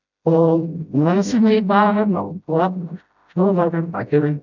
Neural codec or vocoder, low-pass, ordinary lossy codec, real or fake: codec, 16 kHz, 0.5 kbps, FreqCodec, smaller model; 7.2 kHz; none; fake